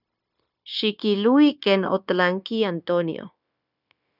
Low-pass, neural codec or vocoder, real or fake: 5.4 kHz; codec, 16 kHz, 0.9 kbps, LongCat-Audio-Codec; fake